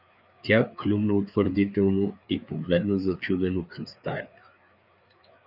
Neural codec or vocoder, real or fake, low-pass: codec, 16 kHz, 4 kbps, FreqCodec, larger model; fake; 5.4 kHz